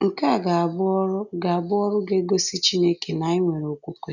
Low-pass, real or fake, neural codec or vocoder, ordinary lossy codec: 7.2 kHz; real; none; none